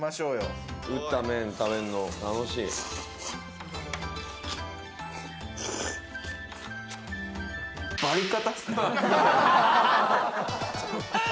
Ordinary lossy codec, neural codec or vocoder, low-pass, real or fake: none; none; none; real